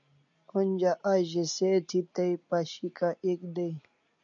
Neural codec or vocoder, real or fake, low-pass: none; real; 7.2 kHz